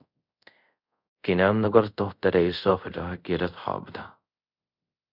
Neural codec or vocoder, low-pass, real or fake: codec, 24 kHz, 0.5 kbps, DualCodec; 5.4 kHz; fake